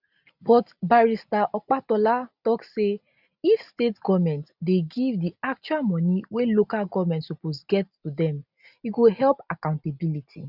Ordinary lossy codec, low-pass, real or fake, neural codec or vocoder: none; 5.4 kHz; real; none